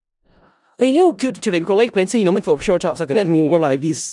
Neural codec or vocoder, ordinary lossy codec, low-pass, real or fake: codec, 16 kHz in and 24 kHz out, 0.4 kbps, LongCat-Audio-Codec, four codebook decoder; none; 10.8 kHz; fake